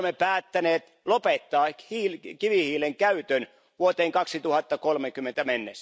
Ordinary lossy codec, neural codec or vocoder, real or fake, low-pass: none; none; real; none